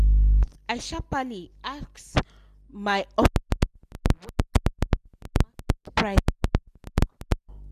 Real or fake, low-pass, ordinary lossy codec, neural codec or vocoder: real; 14.4 kHz; none; none